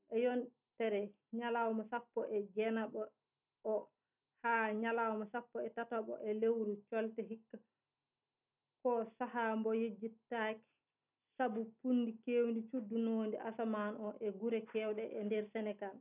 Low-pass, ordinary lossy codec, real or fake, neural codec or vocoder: 3.6 kHz; none; real; none